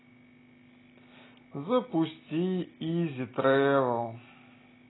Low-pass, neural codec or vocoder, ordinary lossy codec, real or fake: 7.2 kHz; none; AAC, 16 kbps; real